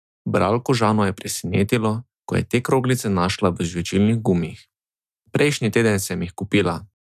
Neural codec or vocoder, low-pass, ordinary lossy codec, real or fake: none; 14.4 kHz; none; real